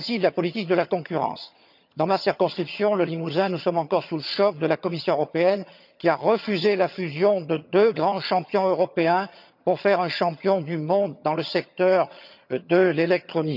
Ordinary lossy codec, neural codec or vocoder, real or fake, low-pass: none; vocoder, 22.05 kHz, 80 mel bands, HiFi-GAN; fake; 5.4 kHz